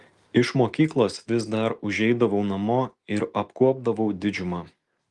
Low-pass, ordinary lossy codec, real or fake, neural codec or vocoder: 10.8 kHz; Opus, 32 kbps; real; none